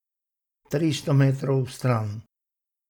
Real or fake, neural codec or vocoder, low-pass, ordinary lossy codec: real; none; 19.8 kHz; none